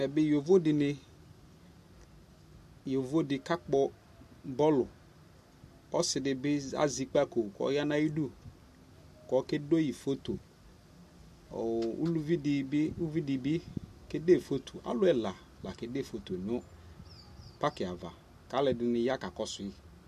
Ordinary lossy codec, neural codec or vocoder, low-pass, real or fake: MP3, 64 kbps; none; 14.4 kHz; real